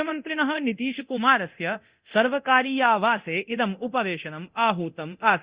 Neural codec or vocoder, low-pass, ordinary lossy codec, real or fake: codec, 24 kHz, 0.9 kbps, DualCodec; 3.6 kHz; Opus, 64 kbps; fake